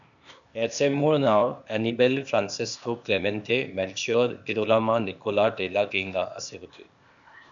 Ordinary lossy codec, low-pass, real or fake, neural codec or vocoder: MP3, 96 kbps; 7.2 kHz; fake; codec, 16 kHz, 0.8 kbps, ZipCodec